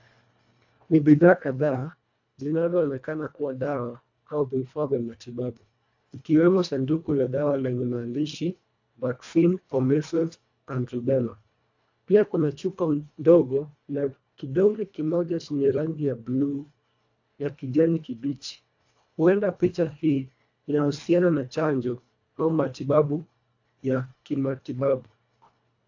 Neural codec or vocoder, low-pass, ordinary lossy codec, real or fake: codec, 24 kHz, 1.5 kbps, HILCodec; 7.2 kHz; AAC, 48 kbps; fake